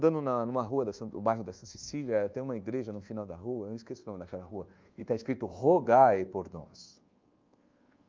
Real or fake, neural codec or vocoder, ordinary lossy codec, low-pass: fake; codec, 24 kHz, 1.2 kbps, DualCodec; Opus, 24 kbps; 7.2 kHz